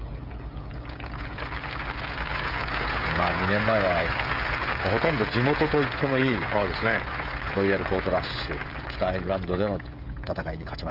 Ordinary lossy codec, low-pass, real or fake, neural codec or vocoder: Opus, 32 kbps; 5.4 kHz; fake; codec, 16 kHz, 16 kbps, FreqCodec, smaller model